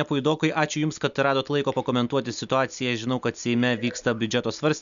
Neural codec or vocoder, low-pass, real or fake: none; 7.2 kHz; real